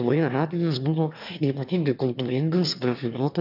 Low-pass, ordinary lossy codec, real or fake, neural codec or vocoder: 5.4 kHz; AAC, 48 kbps; fake; autoencoder, 22.05 kHz, a latent of 192 numbers a frame, VITS, trained on one speaker